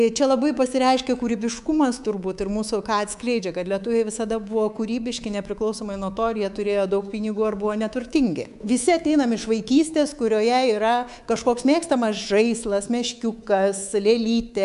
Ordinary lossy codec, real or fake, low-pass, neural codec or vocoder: AAC, 96 kbps; fake; 10.8 kHz; codec, 24 kHz, 3.1 kbps, DualCodec